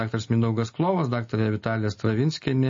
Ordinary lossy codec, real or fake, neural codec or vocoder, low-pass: MP3, 32 kbps; real; none; 7.2 kHz